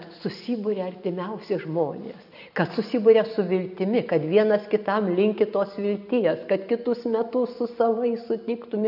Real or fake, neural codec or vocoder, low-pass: real; none; 5.4 kHz